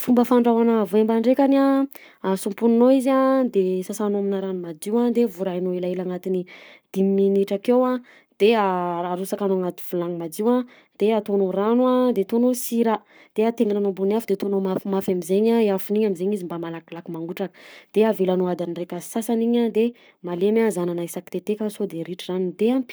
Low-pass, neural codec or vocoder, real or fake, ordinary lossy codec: none; codec, 44.1 kHz, 7.8 kbps, Pupu-Codec; fake; none